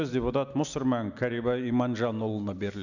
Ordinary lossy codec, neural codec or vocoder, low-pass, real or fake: none; none; 7.2 kHz; real